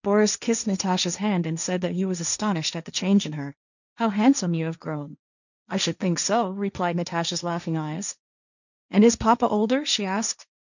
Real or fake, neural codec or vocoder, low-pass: fake; codec, 16 kHz, 1.1 kbps, Voila-Tokenizer; 7.2 kHz